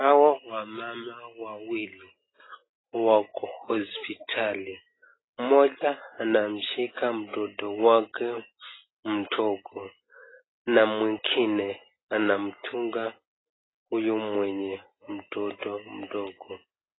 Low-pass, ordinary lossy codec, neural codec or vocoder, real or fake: 7.2 kHz; AAC, 16 kbps; none; real